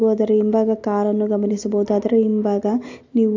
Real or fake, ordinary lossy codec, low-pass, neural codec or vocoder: real; AAC, 48 kbps; 7.2 kHz; none